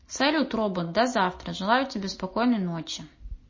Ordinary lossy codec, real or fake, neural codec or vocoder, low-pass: MP3, 32 kbps; real; none; 7.2 kHz